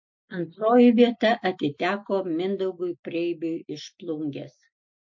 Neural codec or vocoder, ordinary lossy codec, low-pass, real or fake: none; MP3, 48 kbps; 7.2 kHz; real